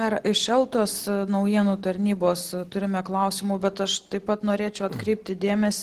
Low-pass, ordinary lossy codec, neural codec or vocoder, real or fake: 14.4 kHz; Opus, 16 kbps; none; real